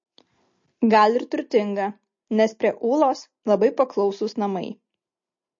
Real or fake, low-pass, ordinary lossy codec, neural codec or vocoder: real; 7.2 kHz; MP3, 32 kbps; none